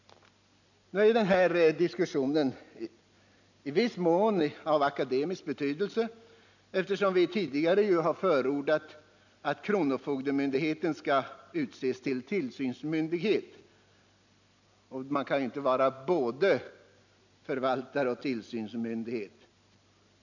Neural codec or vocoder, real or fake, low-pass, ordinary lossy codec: none; real; 7.2 kHz; none